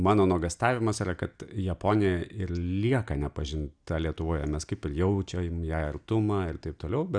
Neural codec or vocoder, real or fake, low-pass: none; real; 9.9 kHz